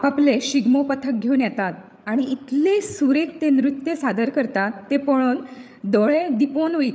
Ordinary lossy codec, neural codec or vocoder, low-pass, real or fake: none; codec, 16 kHz, 8 kbps, FreqCodec, larger model; none; fake